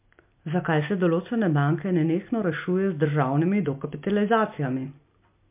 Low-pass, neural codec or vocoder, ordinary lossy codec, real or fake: 3.6 kHz; none; MP3, 32 kbps; real